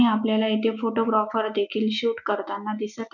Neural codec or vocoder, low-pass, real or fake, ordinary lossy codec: none; 7.2 kHz; real; none